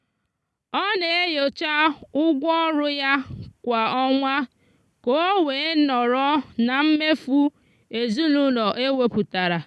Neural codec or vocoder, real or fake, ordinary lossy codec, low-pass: none; real; none; none